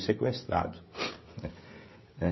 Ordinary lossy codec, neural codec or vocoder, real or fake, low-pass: MP3, 24 kbps; codec, 16 kHz, 16 kbps, FreqCodec, smaller model; fake; 7.2 kHz